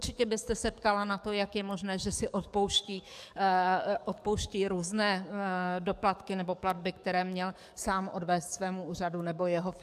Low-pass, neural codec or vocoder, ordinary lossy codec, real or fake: 14.4 kHz; codec, 44.1 kHz, 7.8 kbps, DAC; AAC, 96 kbps; fake